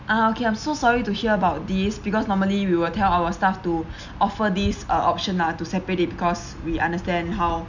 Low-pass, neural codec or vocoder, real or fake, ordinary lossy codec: 7.2 kHz; none; real; none